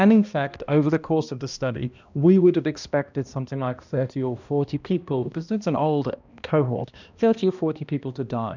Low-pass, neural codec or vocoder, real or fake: 7.2 kHz; codec, 16 kHz, 1 kbps, X-Codec, HuBERT features, trained on balanced general audio; fake